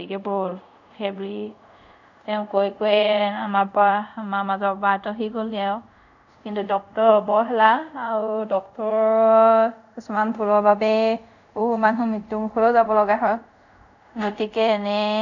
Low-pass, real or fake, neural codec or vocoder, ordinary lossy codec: 7.2 kHz; fake; codec, 24 kHz, 0.5 kbps, DualCodec; none